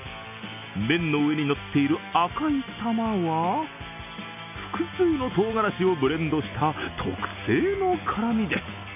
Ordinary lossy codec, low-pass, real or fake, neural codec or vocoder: none; 3.6 kHz; real; none